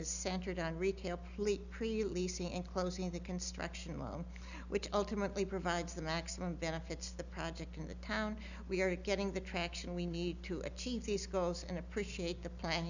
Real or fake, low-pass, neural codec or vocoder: real; 7.2 kHz; none